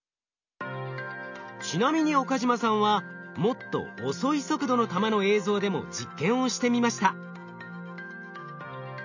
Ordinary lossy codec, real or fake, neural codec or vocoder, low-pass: none; real; none; 7.2 kHz